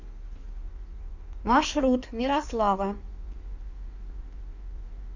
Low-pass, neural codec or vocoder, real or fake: 7.2 kHz; codec, 16 kHz in and 24 kHz out, 1.1 kbps, FireRedTTS-2 codec; fake